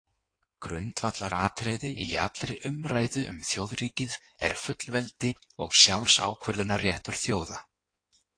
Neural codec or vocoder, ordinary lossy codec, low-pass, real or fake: codec, 16 kHz in and 24 kHz out, 1.1 kbps, FireRedTTS-2 codec; AAC, 48 kbps; 9.9 kHz; fake